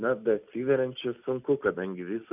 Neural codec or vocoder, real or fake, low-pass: none; real; 3.6 kHz